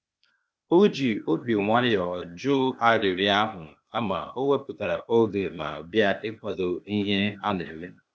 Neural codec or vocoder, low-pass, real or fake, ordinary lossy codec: codec, 16 kHz, 0.8 kbps, ZipCodec; none; fake; none